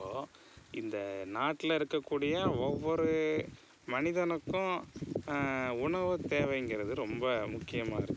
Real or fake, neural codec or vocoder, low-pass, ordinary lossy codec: real; none; none; none